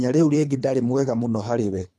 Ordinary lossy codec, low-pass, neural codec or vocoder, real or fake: none; none; codec, 24 kHz, 3 kbps, HILCodec; fake